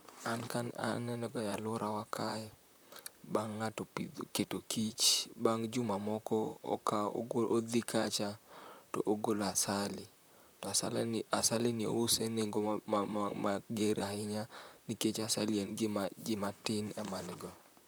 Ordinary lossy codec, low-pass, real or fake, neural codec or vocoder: none; none; fake; vocoder, 44.1 kHz, 128 mel bands, Pupu-Vocoder